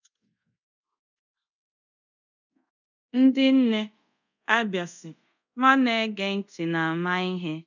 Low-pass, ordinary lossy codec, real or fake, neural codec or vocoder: 7.2 kHz; none; fake; codec, 24 kHz, 0.5 kbps, DualCodec